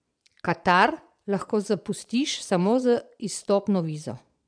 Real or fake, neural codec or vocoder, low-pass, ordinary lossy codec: fake; vocoder, 22.05 kHz, 80 mel bands, Vocos; 9.9 kHz; none